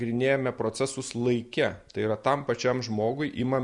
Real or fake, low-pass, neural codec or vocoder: real; 10.8 kHz; none